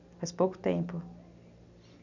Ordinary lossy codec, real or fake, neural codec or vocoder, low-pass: none; real; none; 7.2 kHz